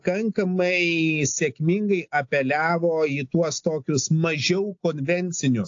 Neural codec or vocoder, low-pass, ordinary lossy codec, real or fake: none; 7.2 kHz; AAC, 48 kbps; real